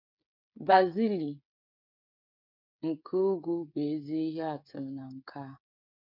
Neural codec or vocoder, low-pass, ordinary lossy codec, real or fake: codec, 16 kHz, 8 kbps, FreqCodec, smaller model; 5.4 kHz; none; fake